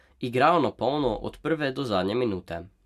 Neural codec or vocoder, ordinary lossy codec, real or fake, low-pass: none; MP3, 96 kbps; real; 14.4 kHz